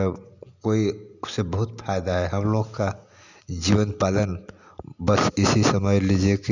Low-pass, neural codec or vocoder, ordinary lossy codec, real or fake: 7.2 kHz; none; none; real